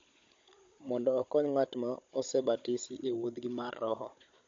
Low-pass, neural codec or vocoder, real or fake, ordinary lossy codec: 7.2 kHz; codec, 16 kHz, 16 kbps, FunCodec, trained on Chinese and English, 50 frames a second; fake; MP3, 48 kbps